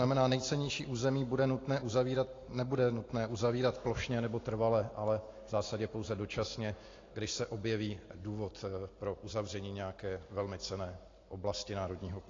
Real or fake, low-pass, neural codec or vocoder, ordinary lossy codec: real; 7.2 kHz; none; AAC, 32 kbps